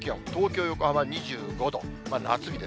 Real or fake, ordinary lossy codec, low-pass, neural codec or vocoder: real; none; none; none